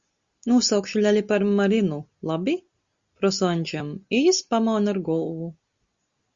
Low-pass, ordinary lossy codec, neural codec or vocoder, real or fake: 7.2 kHz; Opus, 64 kbps; none; real